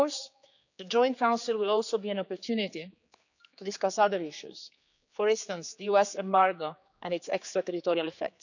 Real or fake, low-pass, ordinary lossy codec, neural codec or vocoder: fake; 7.2 kHz; none; codec, 16 kHz, 4 kbps, X-Codec, HuBERT features, trained on general audio